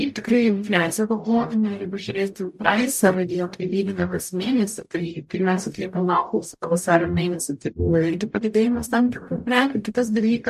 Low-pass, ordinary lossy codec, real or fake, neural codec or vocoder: 14.4 kHz; MP3, 64 kbps; fake; codec, 44.1 kHz, 0.9 kbps, DAC